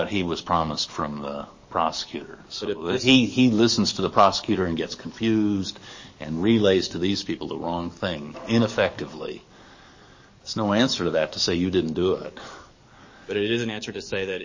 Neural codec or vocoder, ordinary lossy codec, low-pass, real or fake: codec, 44.1 kHz, 7.8 kbps, DAC; MP3, 32 kbps; 7.2 kHz; fake